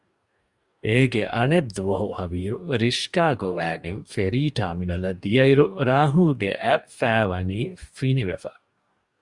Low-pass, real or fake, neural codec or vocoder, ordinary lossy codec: 10.8 kHz; fake; codec, 44.1 kHz, 2.6 kbps, DAC; Opus, 64 kbps